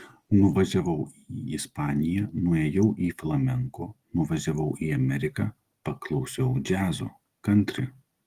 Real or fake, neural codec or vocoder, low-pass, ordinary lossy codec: real; none; 14.4 kHz; Opus, 24 kbps